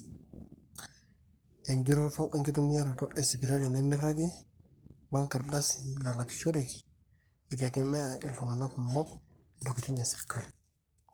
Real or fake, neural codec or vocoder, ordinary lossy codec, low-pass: fake; codec, 44.1 kHz, 3.4 kbps, Pupu-Codec; none; none